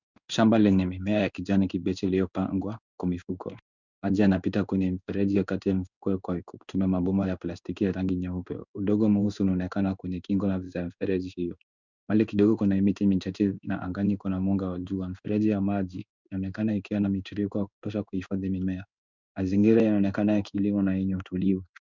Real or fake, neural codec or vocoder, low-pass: fake; codec, 16 kHz in and 24 kHz out, 1 kbps, XY-Tokenizer; 7.2 kHz